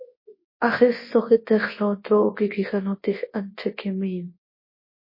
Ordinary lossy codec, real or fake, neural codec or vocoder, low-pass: MP3, 24 kbps; fake; codec, 24 kHz, 0.9 kbps, WavTokenizer, large speech release; 5.4 kHz